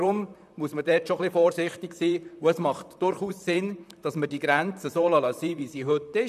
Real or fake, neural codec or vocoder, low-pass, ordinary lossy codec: fake; vocoder, 44.1 kHz, 128 mel bands, Pupu-Vocoder; 14.4 kHz; none